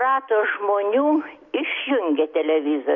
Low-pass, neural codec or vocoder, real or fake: 7.2 kHz; none; real